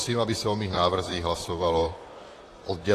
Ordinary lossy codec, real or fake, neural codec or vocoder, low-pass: AAC, 48 kbps; fake; vocoder, 44.1 kHz, 128 mel bands, Pupu-Vocoder; 14.4 kHz